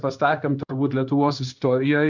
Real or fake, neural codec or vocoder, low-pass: fake; codec, 16 kHz in and 24 kHz out, 1 kbps, XY-Tokenizer; 7.2 kHz